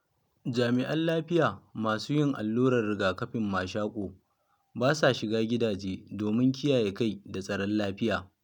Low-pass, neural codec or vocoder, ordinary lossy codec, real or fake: 19.8 kHz; none; none; real